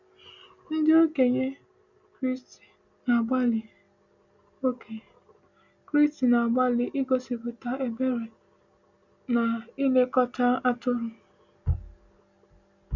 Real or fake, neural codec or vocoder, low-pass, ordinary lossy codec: real; none; 7.2 kHz; none